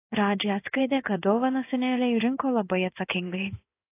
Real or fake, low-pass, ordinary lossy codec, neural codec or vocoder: fake; 3.6 kHz; AAC, 24 kbps; codec, 16 kHz in and 24 kHz out, 1 kbps, XY-Tokenizer